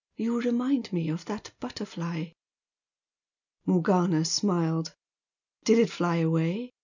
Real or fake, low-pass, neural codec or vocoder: real; 7.2 kHz; none